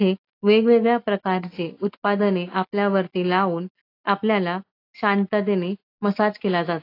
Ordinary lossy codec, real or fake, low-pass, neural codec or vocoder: AAC, 32 kbps; real; 5.4 kHz; none